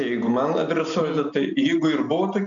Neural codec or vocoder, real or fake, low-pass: none; real; 7.2 kHz